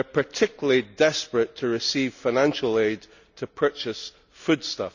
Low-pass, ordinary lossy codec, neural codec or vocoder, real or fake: 7.2 kHz; none; none; real